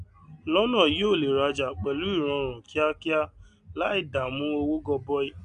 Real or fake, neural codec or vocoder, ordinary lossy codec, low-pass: real; none; MP3, 96 kbps; 9.9 kHz